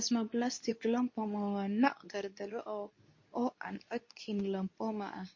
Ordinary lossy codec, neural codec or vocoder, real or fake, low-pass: MP3, 32 kbps; codec, 24 kHz, 0.9 kbps, WavTokenizer, medium speech release version 2; fake; 7.2 kHz